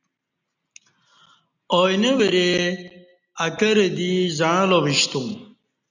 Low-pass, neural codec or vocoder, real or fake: 7.2 kHz; none; real